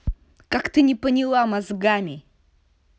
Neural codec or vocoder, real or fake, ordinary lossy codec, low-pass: none; real; none; none